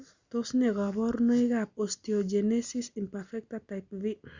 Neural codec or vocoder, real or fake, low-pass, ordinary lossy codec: none; real; 7.2 kHz; Opus, 64 kbps